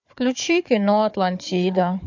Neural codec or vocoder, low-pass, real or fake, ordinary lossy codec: codec, 16 kHz, 4 kbps, FunCodec, trained on Chinese and English, 50 frames a second; 7.2 kHz; fake; MP3, 48 kbps